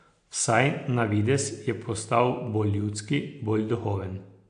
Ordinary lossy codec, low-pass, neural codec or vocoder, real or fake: AAC, 64 kbps; 9.9 kHz; none; real